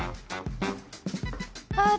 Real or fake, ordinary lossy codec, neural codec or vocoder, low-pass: real; none; none; none